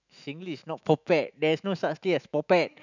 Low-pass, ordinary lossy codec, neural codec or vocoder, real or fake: 7.2 kHz; none; autoencoder, 48 kHz, 128 numbers a frame, DAC-VAE, trained on Japanese speech; fake